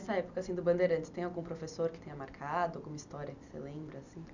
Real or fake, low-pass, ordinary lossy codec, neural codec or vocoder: real; 7.2 kHz; none; none